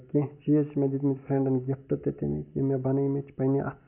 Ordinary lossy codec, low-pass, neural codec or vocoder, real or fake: none; 3.6 kHz; none; real